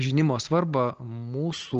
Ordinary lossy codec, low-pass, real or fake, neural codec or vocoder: Opus, 16 kbps; 7.2 kHz; real; none